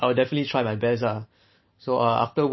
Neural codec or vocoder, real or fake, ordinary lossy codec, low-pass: none; real; MP3, 24 kbps; 7.2 kHz